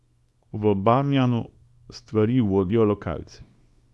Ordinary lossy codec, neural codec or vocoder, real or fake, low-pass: none; codec, 24 kHz, 0.9 kbps, WavTokenizer, small release; fake; none